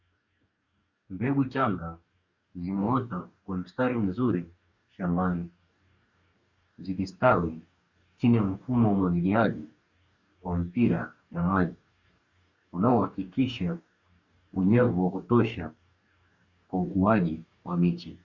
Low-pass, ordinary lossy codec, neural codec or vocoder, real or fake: 7.2 kHz; Opus, 64 kbps; codec, 44.1 kHz, 2.6 kbps, DAC; fake